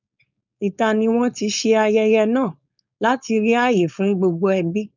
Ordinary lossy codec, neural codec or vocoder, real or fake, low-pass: none; codec, 16 kHz, 4.8 kbps, FACodec; fake; 7.2 kHz